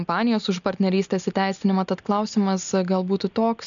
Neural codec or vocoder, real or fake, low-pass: none; real; 7.2 kHz